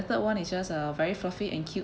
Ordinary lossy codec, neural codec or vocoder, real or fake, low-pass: none; none; real; none